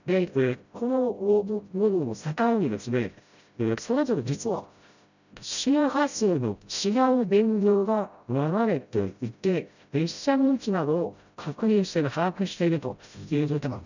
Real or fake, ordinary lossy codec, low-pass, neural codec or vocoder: fake; none; 7.2 kHz; codec, 16 kHz, 0.5 kbps, FreqCodec, smaller model